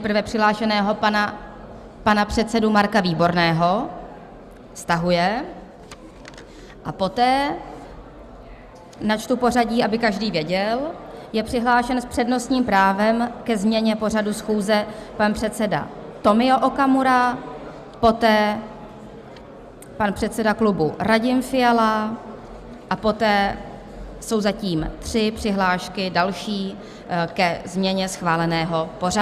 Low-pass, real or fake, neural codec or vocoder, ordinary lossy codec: 14.4 kHz; real; none; Opus, 64 kbps